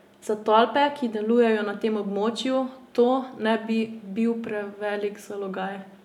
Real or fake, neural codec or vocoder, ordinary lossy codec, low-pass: real; none; none; 19.8 kHz